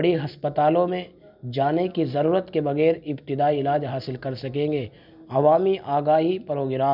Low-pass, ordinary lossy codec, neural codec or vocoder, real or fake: 5.4 kHz; none; none; real